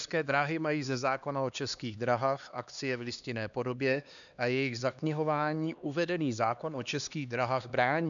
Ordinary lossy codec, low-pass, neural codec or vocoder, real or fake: MP3, 96 kbps; 7.2 kHz; codec, 16 kHz, 2 kbps, X-Codec, HuBERT features, trained on LibriSpeech; fake